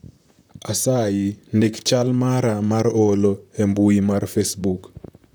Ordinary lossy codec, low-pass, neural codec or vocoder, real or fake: none; none; vocoder, 44.1 kHz, 128 mel bands, Pupu-Vocoder; fake